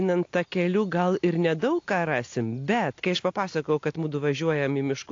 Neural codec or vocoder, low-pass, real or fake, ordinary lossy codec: none; 7.2 kHz; real; AAC, 48 kbps